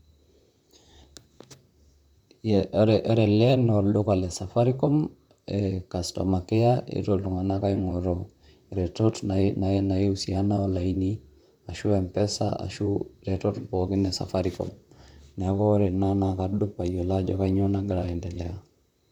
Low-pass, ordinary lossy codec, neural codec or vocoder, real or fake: 19.8 kHz; none; vocoder, 44.1 kHz, 128 mel bands, Pupu-Vocoder; fake